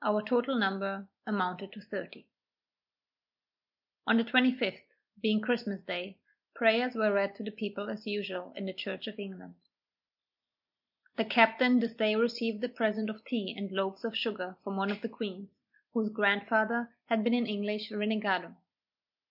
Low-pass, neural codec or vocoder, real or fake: 5.4 kHz; none; real